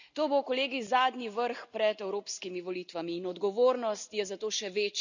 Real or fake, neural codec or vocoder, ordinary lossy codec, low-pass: real; none; none; 7.2 kHz